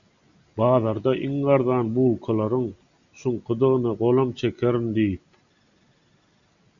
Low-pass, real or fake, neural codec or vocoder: 7.2 kHz; real; none